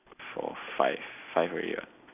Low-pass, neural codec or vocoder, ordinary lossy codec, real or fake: 3.6 kHz; none; none; real